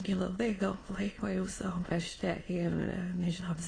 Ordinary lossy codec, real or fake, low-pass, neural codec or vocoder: AAC, 32 kbps; fake; 9.9 kHz; autoencoder, 22.05 kHz, a latent of 192 numbers a frame, VITS, trained on many speakers